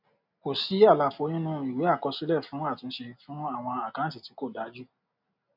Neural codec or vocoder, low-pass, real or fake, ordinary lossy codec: none; 5.4 kHz; real; none